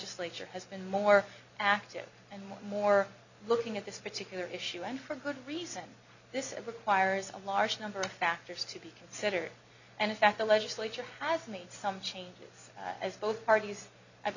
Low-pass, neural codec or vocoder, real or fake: 7.2 kHz; none; real